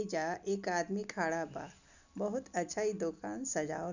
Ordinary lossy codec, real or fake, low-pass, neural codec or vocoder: none; real; 7.2 kHz; none